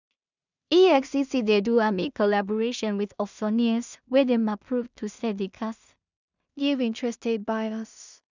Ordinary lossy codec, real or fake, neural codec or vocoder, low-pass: none; fake; codec, 16 kHz in and 24 kHz out, 0.4 kbps, LongCat-Audio-Codec, two codebook decoder; 7.2 kHz